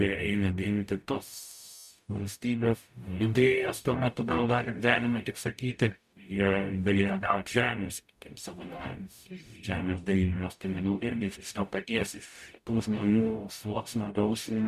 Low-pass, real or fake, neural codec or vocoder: 14.4 kHz; fake; codec, 44.1 kHz, 0.9 kbps, DAC